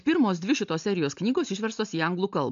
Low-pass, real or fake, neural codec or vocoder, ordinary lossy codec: 7.2 kHz; real; none; AAC, 64 kbps